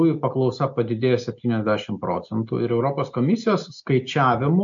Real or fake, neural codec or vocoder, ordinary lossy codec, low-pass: real; none; MP3, 48 kbps; 7.2 kHz